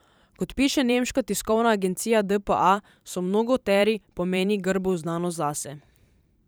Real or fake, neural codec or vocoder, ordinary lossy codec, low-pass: fake; vocoder, 44.1 kHz, 128 mel bands every 512 samples, BigVGAN v2; none; none